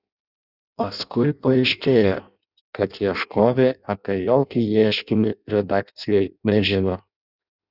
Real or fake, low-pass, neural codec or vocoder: fake; 5.4 kHz; codec, 16 kHz in and 24 kHz out, 0.6 kbps, FireRedTTS-2 codec